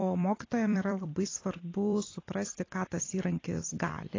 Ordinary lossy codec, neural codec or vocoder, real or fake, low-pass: AAC, 32 kbps; vocoder, 44.1 kHz, 128 mel bands every 256 samples, BigVGAN v2; fake; 7.2 kHz